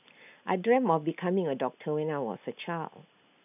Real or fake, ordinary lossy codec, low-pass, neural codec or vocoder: real; none; 3.6 kHz; none